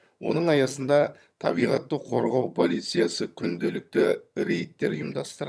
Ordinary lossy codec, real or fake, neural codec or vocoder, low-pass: none; fake; vocoder, 22.05 kHz, 80 mel bands, HiFi-GAN; none